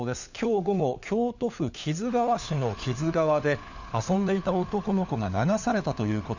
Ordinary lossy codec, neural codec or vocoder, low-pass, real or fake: none; codec, 16 kHz, 4 kbps, FunCodec, trained on LibriTTS, 50 frames a second; 7.2 kHz; fake